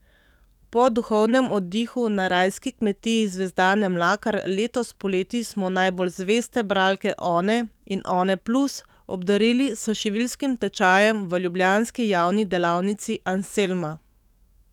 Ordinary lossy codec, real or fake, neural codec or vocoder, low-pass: none; fake; codec, 44.1 kHz, 7.8 kbps, DAC; 19.8 kHz